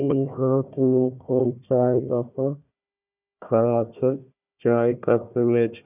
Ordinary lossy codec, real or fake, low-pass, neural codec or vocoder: none; fake; 3.6 kHz; codec, 16 kHz, 1 kbps, FunCodec, trained on Chinese and English, 50 frames a second